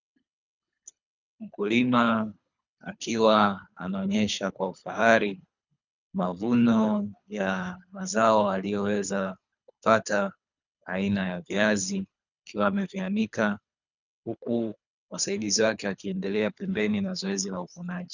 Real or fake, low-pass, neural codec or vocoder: fake; 7.2 kHz; codec, 24 kHz, 3 kbps, HILCodec